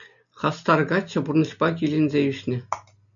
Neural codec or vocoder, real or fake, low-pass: none; real; 7.2 kHz